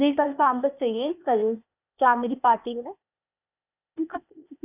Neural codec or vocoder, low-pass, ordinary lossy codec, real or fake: codec, 16 kHz, 0.8 kbps, ZipCodec; 3.6 kHz; none; fake